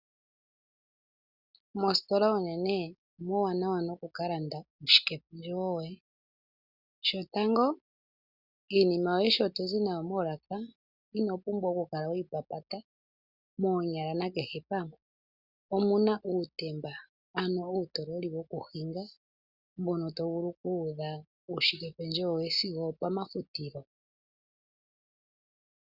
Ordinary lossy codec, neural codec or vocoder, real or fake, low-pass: Opus, 64 kbps; none; real; 5.4 kHz